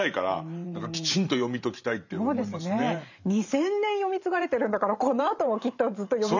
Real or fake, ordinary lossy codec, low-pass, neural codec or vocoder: fake; none; 7.2 kHz; vocoder, 44.1 kHz, 128 mel bands every 512 samples, BigVGAN v2